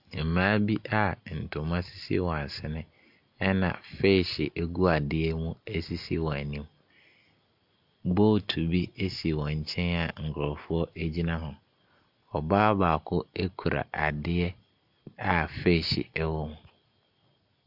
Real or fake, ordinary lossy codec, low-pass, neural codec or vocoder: real; AAC, 48 kbps; 5.4 kHz; none